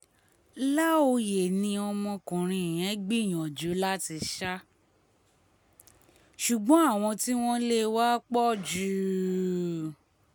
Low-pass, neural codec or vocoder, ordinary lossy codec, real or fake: none; none; none; real